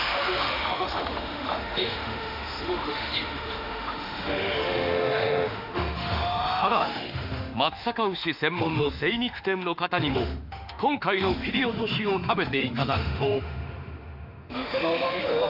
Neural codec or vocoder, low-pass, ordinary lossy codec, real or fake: autoencoder, 48 kHz, 32 numbers a frame, DAC-VAE, trained on Japanese speech; 5.4 kHz; AAC, 48 kbps; fake